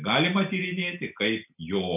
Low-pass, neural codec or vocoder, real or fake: 3.6 kHz; none; real